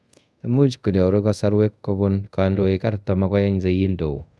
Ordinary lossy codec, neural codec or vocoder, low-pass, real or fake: none; codec, 24 kHz, 0.5 kbps, DualCodec; none; fake